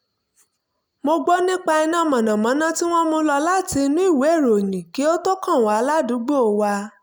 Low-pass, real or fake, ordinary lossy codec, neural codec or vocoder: none; real; none; none